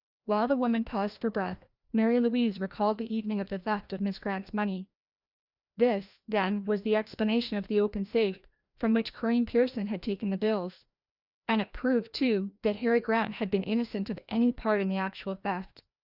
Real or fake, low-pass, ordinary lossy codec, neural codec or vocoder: fake; 5.4 kHz; Opus, 64 kbps; codec, 16 kHz, 1 kbps, FreqCodec, larger model